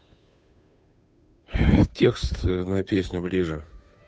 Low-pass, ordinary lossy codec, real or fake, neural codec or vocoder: none; none; fake; codec, 16 kHz, 2 kbps, FunCodec, trained on Chinese and English, 25 frames a second